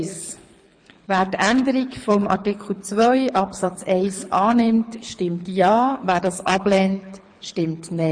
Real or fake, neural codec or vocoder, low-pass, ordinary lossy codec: fake; codec, 24 kHz, 6 kbps, HILCodec; 9.9 kHz; MP3, 48 kbps